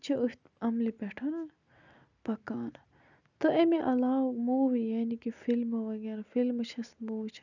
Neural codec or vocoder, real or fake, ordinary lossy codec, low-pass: none; real; none; 7.2 kHz